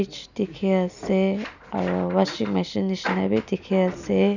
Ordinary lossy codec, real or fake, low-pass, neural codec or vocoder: none; real; 7.2 kHz; none